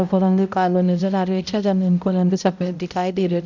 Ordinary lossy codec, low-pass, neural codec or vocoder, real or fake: none; 7.2 kHz; codec, 16 kHz, 0.5 kbps, X-Codec, HuBERT features, trained on balanced general audio; fake